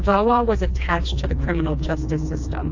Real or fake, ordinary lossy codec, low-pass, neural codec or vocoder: fake; AAC, 48 kbps; 7.2 kHz; codec, 16 kHz, 2 kbps, FreqCodec, smaller model